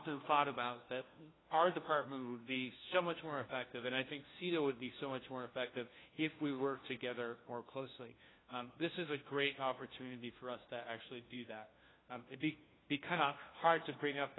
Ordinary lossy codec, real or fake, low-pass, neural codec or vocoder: AAC, 16 kbps; fake; 7.2 kHz; codec, 16 kHz, 1 kbps, FunCodec, trained on LibriTTS, 50 frames a second